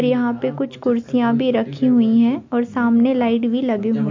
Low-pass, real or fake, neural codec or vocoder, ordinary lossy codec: 7.2 kHz; real; none; MP3, 48 kbps